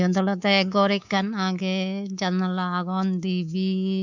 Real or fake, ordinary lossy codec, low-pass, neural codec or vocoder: fake; none; 7.2 kHz; codec, 24 kHz, 3.1 kbps, DualCodec